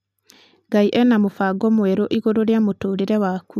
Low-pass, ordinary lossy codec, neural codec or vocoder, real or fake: 14.4 kHz; none; none; real